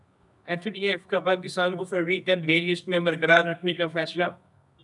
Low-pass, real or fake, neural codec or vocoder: 10.8 kHz; fake; codec, 24 kHz, 0.9 kbps, WavTokenizer, medium music audio release